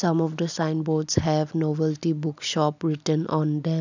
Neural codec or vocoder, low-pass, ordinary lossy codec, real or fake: none; 7.2 kHz; none; real